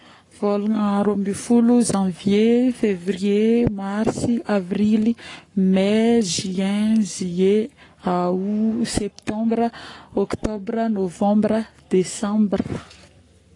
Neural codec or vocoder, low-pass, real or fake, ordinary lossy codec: codec, 44.1 kHz, 7.8 kbps, Pupu-Codec; 10.8 kHz; fake; AAC, 32 kbps